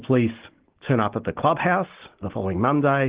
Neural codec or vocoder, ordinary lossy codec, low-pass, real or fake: none; Opus, 16 kbps; 3.6 kHz; real